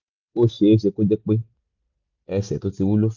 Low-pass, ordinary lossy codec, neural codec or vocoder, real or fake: 7.2 kHz; none; none; real